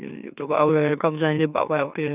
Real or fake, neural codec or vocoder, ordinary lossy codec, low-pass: fake; autoencoder, 44.1 kHz, a latent of 192 numbers a frame, MeloTTS; none; 3.6 kHz